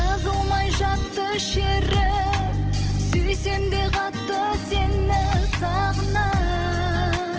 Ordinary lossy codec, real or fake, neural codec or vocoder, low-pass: Opus, 16 kbps; real; none; 7.2 kHz